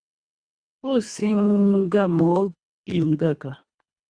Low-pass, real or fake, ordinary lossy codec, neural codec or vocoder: 9.9 kHz; fake; Opus, 64 kbps; codec, 24 kHz, 1.5 kbps, HILCodec